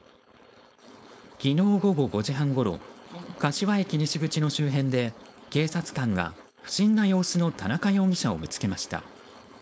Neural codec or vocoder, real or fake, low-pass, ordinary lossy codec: codec, 16 kHz, 4.8 kbps, FACodec; fake; none; none